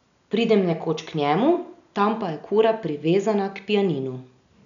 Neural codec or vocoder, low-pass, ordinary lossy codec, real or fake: none; 7.2 kHz; none; real